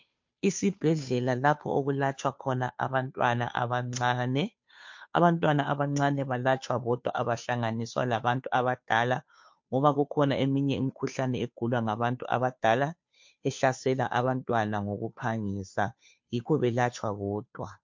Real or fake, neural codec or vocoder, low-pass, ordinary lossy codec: fake; codec, 16 kHz, 2 kbps, FunCodec, trained on Chinese and English, 25 frames a second; 7.2 kHz; MP3, 48 kbps